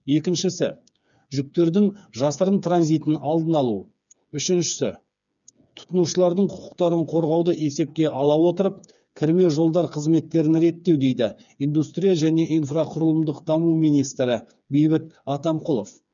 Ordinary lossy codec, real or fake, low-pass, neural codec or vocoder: none; fake; 7.2 kHz; codec, 16 kHz, 4 kbps, FreqCodec, smaller model